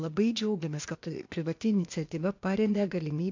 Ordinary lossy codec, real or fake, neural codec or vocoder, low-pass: MP3, 64 kbps; fake; codec, 16 kHz, 0.8 kbps, ZipCodec; 7.2 kHz